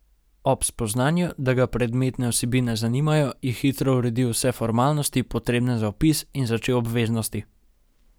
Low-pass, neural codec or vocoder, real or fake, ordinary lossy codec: none; none; real; none